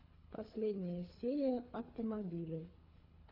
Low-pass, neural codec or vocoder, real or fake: 5.4 kHz; codec, 24 kHz, 3 kbps, HILCodec; fake